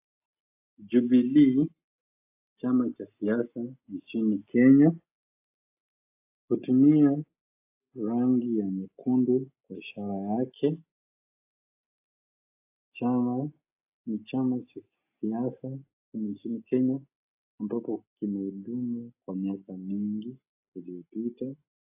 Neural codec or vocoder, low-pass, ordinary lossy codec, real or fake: none; 3.6 kHz; AAC, 32 kbps; real